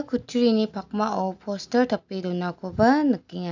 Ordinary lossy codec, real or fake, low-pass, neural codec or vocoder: none; real; 7.2 kHz; none